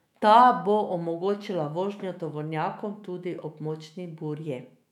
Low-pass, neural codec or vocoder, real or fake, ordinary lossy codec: 19.8 kHz; autoencoder, 48 kHz, 128 numbers a frame, DAC-VAE, trained on Japanese speech; fake; none